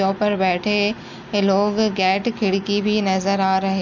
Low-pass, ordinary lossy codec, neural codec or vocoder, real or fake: 7.2 kHz; none; none; real